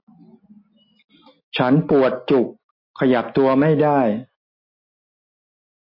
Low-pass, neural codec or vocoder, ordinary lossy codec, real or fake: 5.4 kHz; none; MP3, 32 kbps; real